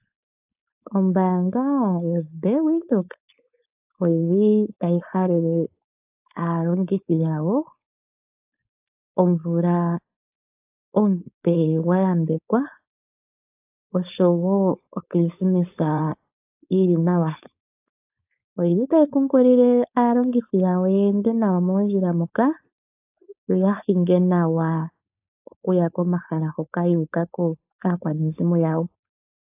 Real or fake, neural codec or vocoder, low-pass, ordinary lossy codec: fake; codec, 16 kHz, 4.8 kbps, FACodec; 3.6 kHz; AAC, 32 kbps